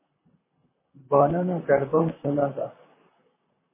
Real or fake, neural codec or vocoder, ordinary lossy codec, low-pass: fake; vocoder, 44.1 kHz, 128 mel bands, Pupu-Vocoder; MP3, 16 kbps; 3.6 kHz